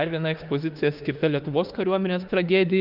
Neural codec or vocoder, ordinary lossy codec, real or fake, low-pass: autoencoder, 48 kHz, 32 numbers a frame, DAC-VAE, trained on Japanese speech; Opus, 32 kbps; fake; 5.4 kHz